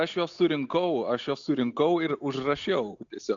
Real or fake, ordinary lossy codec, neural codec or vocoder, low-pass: real; AAC, 64 kbps; none; 7.2 kHz